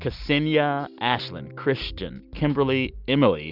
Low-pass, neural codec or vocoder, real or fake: 5.4 kHz; none; real